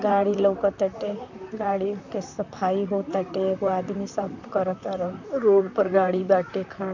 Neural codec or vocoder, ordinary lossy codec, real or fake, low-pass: vocoder, 44.1 kHz, 128 mel bands, Pupu-Vocoder; none; fake; 7.2 kHz